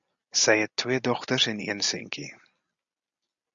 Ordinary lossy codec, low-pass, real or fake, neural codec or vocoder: Opus, 64 kbps; 7.2 kHz; real; none